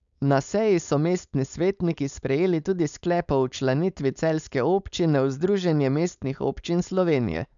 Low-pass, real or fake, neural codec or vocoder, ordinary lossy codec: 7.2 kHz; fake; codec, 16 kHz, 4.8 kbps, FACodec; none